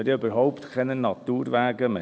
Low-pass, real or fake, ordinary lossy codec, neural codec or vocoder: none; real; none; none